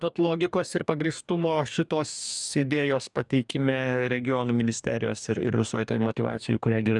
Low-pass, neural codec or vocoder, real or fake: 10.8 kHz; codec, 44.1 kHz, 2.6 kbps, DAC; fake